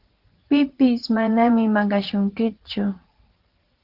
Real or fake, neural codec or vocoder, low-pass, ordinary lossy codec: fake; vocoder, 44.1 kHz, 80 mel bands, Vocos; 5.4 kHz; Opus, 16 kbps